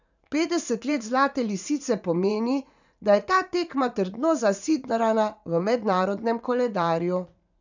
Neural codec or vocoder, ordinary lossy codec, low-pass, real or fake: vocoder, 44.1 kHz, 80 mel bands, Vocos; none; 7.2 kHz; fake